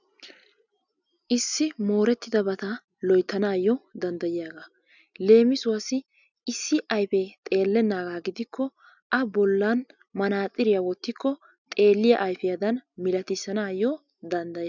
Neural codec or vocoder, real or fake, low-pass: none; real; 7.2 kHz